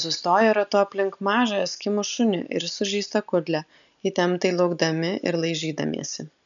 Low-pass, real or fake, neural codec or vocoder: 7.2 kHz; real; none